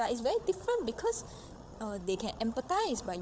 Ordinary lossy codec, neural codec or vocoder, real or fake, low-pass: none; codec, 16 kHz, 8 kbps, FreqCodec, larger model; fake; none